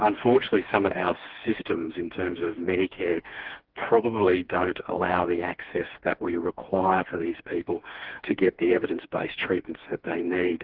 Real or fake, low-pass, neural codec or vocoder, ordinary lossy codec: fake; 5.4 kHz; codec, 16 kHz, 2 kbps, FreqCodec, smaller model; Opus, 16 kbps